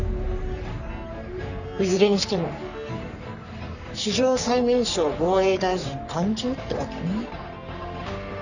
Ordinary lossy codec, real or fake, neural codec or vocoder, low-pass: none; fake; codec, 44.1 kHz, 3.4 kbps, Pupu-Codec; 7.2 kHz